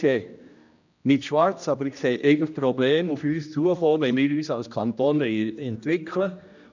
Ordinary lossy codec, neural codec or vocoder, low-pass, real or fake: none; codec, 16 kHz, 1 kbps, X-Codec, HuBERT features, trained on general audio; 7.2 kHz; fake